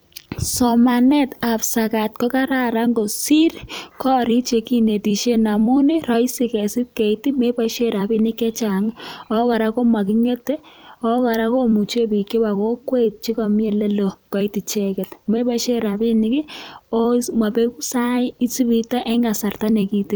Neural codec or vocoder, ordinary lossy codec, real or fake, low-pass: vocoder, 44.1 kHz, 128 mel bands every 256 samples, BigVGAN v2; none; fake; none